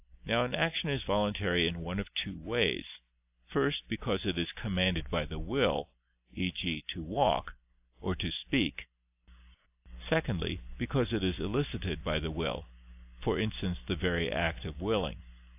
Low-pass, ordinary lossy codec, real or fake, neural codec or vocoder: 3.6 kHz; AAC, 32 kbps; real; none